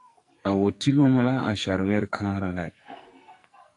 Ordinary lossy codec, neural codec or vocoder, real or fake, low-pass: AAC, 64 kbps; codec, 44.1 kHz, 2.6 kbps, SNAC; fake; 10.8 kHz